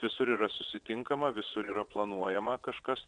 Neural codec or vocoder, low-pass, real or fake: vocoder, 22.05 kHz, 80 mel bands, WaveNeXt; 9.9 kHz; fake